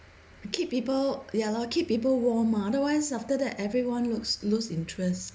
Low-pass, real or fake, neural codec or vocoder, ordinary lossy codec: none; real; none; none